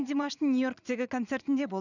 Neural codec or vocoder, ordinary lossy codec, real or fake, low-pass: vocoder, 44.1 kHz, 80 mel bands, Vocos; none; fake; 7.2 kHz